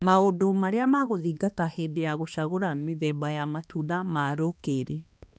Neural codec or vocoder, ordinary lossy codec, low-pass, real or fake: codec, 16 kHz, 2 kbps, X-Codec, HuBERT features, trained on balanced general audio; none; none; fake